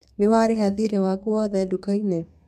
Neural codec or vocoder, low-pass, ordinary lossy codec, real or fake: codec, 32 kHz, 1.9 kbps, SNAC; 14.4 kHz; none; fake